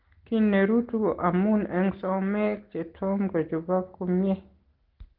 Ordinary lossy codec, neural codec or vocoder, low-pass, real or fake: Opus, 16 kbps; none; 5.4 kHz; real